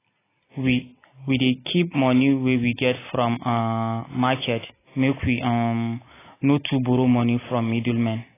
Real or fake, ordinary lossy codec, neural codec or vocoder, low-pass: real; AAC, 16 kbps; none; 3.6 kHz